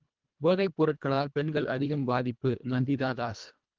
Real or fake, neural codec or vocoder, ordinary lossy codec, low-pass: fake; codec, 24 kHz, 1.5 kbps, HILCodec; Opus, 32 kbps; 7.2 kHz